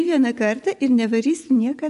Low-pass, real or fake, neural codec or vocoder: 10.8 kHz; fake; vocoder, 24 kHz, 100 mel bands, Vocos